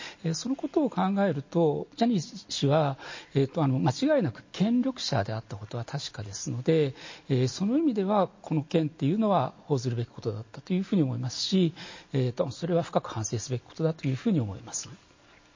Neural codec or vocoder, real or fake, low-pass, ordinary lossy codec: none; real; 7.2 kHz; MP3, 32 kbps